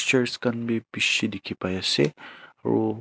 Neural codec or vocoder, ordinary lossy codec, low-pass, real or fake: none; none; none; real